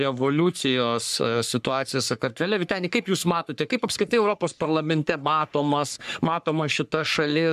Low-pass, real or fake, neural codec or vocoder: 14.4 kHz; fake; codec, 44.1 kHz, 3.4 kbps, Pupu-Codec